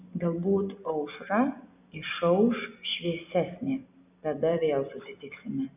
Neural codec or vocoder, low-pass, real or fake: none; 3.6 kHz; real